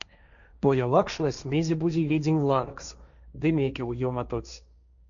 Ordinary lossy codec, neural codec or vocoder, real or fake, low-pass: MP3, 96 kbps; codec, 16 kHz, 1.1 kbps, Voila-Tokenizer; fake; 7.2 kHz